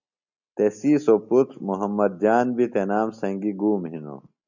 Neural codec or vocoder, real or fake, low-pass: none; real; 7.2 kHz